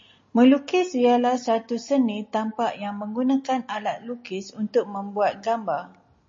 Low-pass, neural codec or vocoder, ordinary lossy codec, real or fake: 7.2 kHz; none; MP3, 32 kbps; real